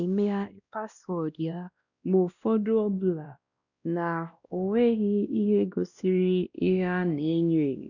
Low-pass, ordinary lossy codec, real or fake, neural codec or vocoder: 7.2 kHz; none; fake; codec, 16 kHz, 1 kbps, X-Codec, WavLM features, trained on Multilingual LibriSpeech